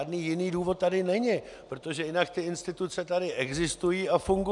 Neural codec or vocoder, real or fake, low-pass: none; real; 10.8 kHz